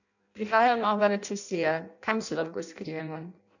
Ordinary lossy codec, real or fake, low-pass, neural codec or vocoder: none; fake; 7.2 kHz; codec, 16 kHz in and 24 kHz out, 0.6 kbps, FireRedTTS-2 codec